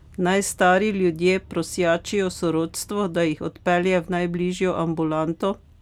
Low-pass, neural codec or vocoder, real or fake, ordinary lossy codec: 19.8 kHz; none; real; none